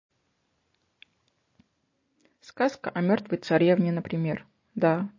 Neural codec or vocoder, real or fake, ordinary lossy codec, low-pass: none; real; MP3, 32 kbps; 7.2 kHz